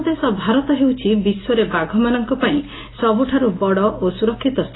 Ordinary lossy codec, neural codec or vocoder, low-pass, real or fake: AAC, 16 kbps; none; 7.2 kHz; real